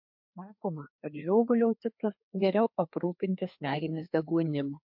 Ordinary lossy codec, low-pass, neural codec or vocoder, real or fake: MP3, 48 kbps; 5.4 kHz; codec, 16 kHz, 2 kbps, FreqCodec, larger model; fake